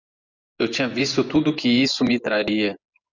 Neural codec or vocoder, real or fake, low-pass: vocoder, 24 kHz, 100 mel bands, Vocos; fake; 7.2 kHz